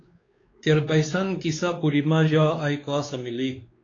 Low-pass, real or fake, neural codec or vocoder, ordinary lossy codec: 7.2 kHz; fake; codec, 16 kHz, 2 kbps, X-Codec, WavLM features, trained on Multilingual LibriSpeech; AAC, 32 kbps